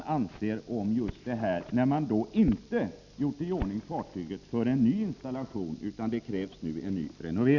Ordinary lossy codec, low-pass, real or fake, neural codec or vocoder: none; 7.2 kHz; real; none